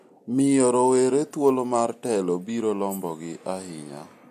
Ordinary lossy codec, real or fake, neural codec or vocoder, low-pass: MP3, 64 kbps; real; none; 14.4 kHz